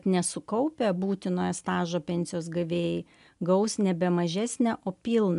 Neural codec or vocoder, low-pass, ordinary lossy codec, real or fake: none; 10.8 kHz; MP3, 96 kbps; real